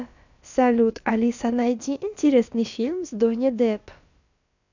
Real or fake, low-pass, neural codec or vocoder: fake; 7.2 kHz; codec, 16 kHz, about 1 kbps, DyCAST, with the encoder's durations